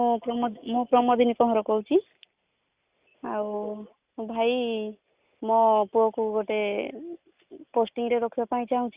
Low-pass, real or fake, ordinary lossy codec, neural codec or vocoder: 3.6 kHz; real; Opus, 64 kbps; none